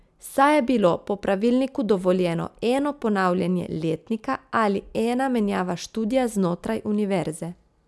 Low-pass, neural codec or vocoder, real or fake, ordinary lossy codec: none; none; real; none